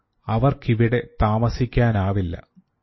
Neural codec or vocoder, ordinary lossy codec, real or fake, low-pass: none; MP3, 24 kbps; real; 7.2 kHz